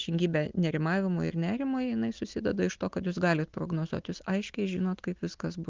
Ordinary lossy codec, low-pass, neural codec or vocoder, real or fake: Opus, 32 kbps; 7.2 kHz; none; real